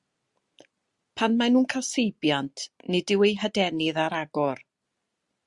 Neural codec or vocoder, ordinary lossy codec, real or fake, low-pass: none; Opus, 64 kbps; real; 10.8 kHz